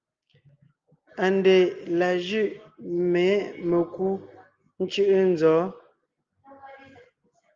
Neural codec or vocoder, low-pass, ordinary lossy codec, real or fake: none; 7.2 kHz; Opus, 32 kbps; real